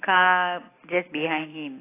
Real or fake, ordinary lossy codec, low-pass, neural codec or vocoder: real; AAC, 16 kbps; 3.6 kHz; none